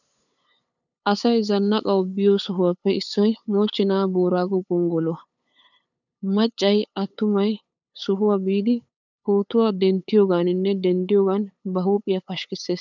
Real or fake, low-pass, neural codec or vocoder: fake; 7.2 kHz; codec, 16 kHz, 8 kbps, FunCodec, trained on LibriTTS, 25 frames a second